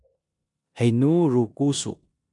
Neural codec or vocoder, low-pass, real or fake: codec, 16 kHz in and 24 kHz out, 0.9 kbps, LongCat-Audio-Codec, four codebook decoder; 10.8 kHz; fake